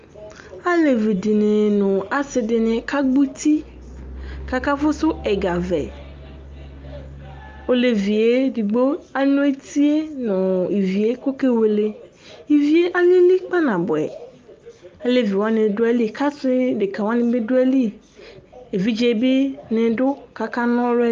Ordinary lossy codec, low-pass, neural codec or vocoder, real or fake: Opus, 32 kbps; 7.2 kHz; none; real